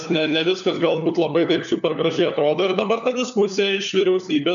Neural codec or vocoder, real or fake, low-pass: codec, 16 kHz, 4 kbps, FunCodec, trained on LibriTTS, 50 frames a second; fake; 7.2 kHz